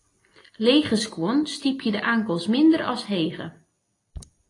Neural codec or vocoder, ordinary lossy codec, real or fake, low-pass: none; AAC, 32 kbps; real; 10.8 kHz